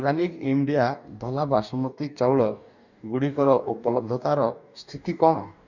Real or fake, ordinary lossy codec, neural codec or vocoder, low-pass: fake; none; codec, 44.1 kHz, 2.6 kbps, DAC; 7.2 kHz